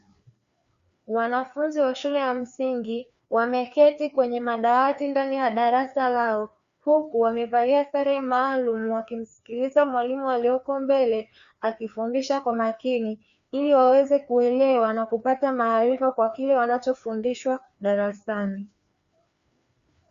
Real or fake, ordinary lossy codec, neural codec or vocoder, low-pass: fake; Opus, 64 kbps; codec, 16 kHz, 2 kbps, FreqCodec, larger model; 7.2 kHz